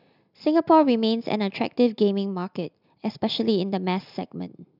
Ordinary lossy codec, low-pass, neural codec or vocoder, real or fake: none; 5.4 kHz; none; real